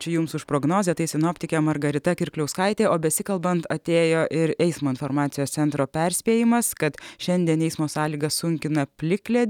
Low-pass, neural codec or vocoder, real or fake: 19.8 kHz; none; real